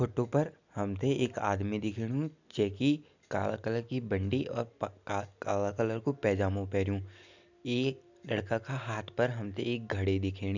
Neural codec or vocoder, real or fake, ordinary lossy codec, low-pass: none; real; none; 7.2 kHz